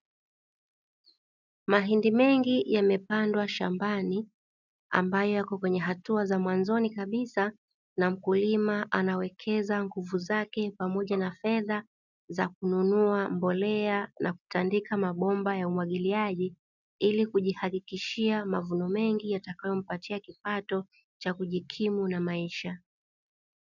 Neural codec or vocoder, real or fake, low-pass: none; real; 7.2 kHz